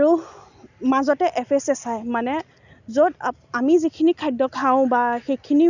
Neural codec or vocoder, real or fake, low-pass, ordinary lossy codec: none; real; 7.2 kHz; none